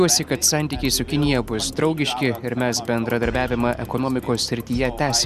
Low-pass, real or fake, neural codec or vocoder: 14.4 kHz; real; none